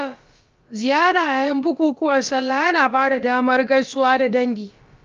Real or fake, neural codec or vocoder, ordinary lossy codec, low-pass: fake; codec, 16 kHz, about 1 kbps, DyCAST, with the encoder's durations; Opus, 32 kbps; 7.2 kHz